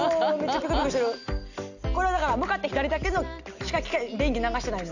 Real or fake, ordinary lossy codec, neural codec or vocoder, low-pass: real; none; none; 7.2 kHz